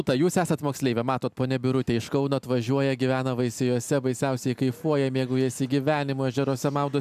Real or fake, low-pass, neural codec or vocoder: real; 14.4 kHz; none